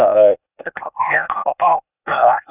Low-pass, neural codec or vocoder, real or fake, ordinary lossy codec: 3.6 kHz; codec, 16 kHz, 0.8 kbps, ZipCodec; fake; none